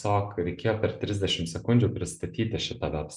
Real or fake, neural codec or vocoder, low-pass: real; none; 10.8 kHz